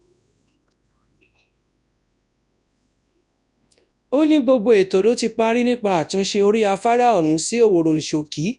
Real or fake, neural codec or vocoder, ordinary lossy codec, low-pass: fake; codec, 24 kHz, 0.9 kbps, WavTokenizer, large speech release; none; 10.8 kHz